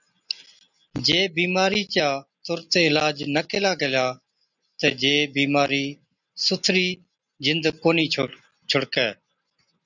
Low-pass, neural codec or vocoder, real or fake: 7.2 kHz; none; real